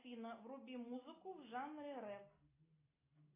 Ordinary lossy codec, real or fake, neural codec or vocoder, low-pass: AAC, 16 kbps; real; none; 3.6 kHz